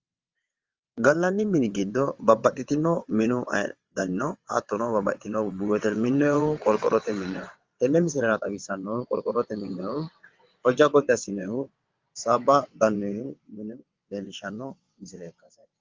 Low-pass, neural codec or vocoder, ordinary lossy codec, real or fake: 7.2 kHz; vocoder, 22.05 kHz, 80 mel bands, WaveNeXt; Opus, 32 kbps; fake